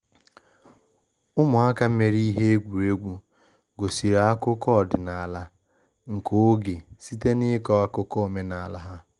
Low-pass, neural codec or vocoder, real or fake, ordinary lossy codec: 10.8 kHz; none; real; Opus, 24 kbps